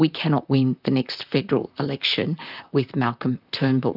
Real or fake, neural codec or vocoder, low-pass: fake; vocoder, 44.1 kHz, 128 mel bands, Pupu-Vocoder; 5.4 kHz